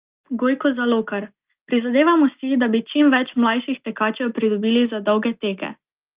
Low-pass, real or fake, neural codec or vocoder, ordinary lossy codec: 3.6 kHz; real; none; Opus, 32 kbps